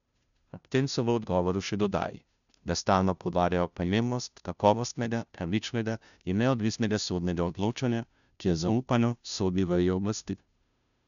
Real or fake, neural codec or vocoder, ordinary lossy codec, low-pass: fake; codec, 16 kHz, 0.5 kbps, FunCodec, trained on Chinese and English, 25 frames a second; none; 7.2 kHz